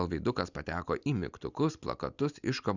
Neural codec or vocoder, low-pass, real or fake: none; 7.2 kHz; real